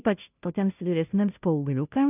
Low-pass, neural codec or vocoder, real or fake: 3.6 kHz; codec, 16 kHz, 0.5 kbps, FunCodec, trained on Chinese and English, 25 frames a second; fake